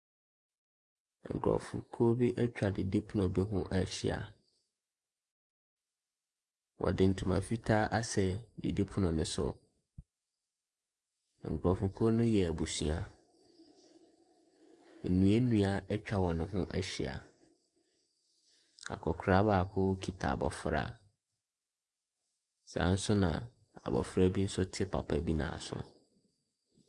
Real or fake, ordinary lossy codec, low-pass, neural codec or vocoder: fake; Opus, 64 kbps; 10.8 kHz; codec, 44.1 kHz, 7.8 kbps, DAC